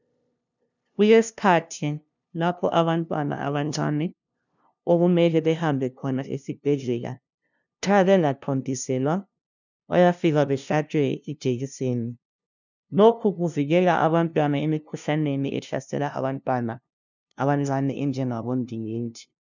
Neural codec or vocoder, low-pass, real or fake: codec, 16 kHz, 0.5 kbps, FunCodec, trained on LibriTTS, 25 frames a second; 7.2 kHz; fake